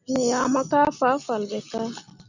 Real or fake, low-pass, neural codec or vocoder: real; 7.2 kHz; none